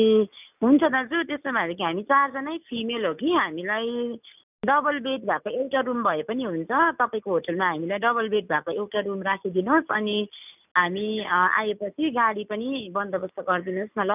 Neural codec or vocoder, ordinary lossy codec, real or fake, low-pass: none; none; real; 3.6 kHz